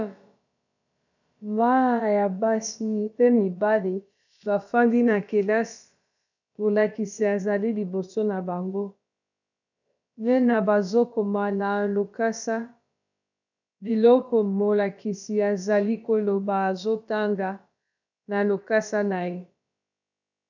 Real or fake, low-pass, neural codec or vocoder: fake; 7.2 kHz; codec, 16 kHz, about 1 kbps, DyCAST, with the encoder's durations